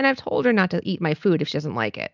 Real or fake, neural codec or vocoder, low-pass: real; none; 7.2 kHz